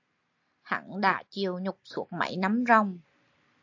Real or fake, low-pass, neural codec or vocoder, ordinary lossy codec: real; 7.2 kHz; none; AAC, 48 kbps